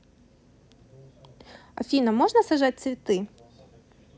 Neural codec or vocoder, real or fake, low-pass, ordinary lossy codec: none; real; none; none